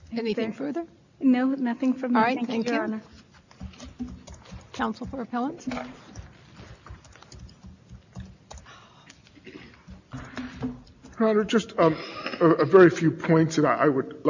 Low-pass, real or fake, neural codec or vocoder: 7.2 kHz; real; none